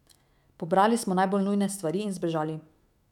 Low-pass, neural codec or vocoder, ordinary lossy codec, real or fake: 19.8 kHz; autoencoder, 48 kHz, 128 numbers a frame, DAC-VAE, trained on Japanese speech; none; fake